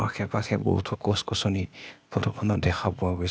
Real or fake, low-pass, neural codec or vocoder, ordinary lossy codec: fake; none; codec, 16 kHz, 0.8 kbps, ZipCodec; none